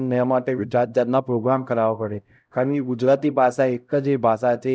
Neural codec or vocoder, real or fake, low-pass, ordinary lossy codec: codec, 16 kHz, 0.5 kbps, X-Codec, HuBERT features, trained on LibriSpeech; fake; none; none